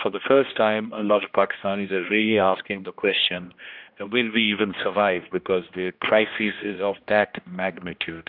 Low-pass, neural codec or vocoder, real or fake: 5.4 kHz; codec, 16 kHz, 1 kbps, X-Codec, HuBERT features, trained on general audio; fake